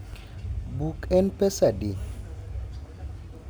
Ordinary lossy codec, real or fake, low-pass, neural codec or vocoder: none; real; none; none